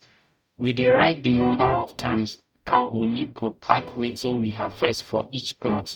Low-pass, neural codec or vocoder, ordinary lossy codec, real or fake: 19.8 kHz; codec, 44.1 kHz, 0.9 kbps, DAC; none; fake